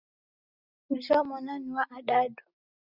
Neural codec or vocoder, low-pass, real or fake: none; 5.4 kHz; real